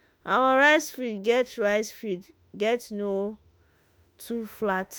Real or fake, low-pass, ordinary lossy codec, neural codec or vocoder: fake; none; none; autoencoder, 48 kHz, 32 numbers a frame, DAC-VAE, trained on Japanese speech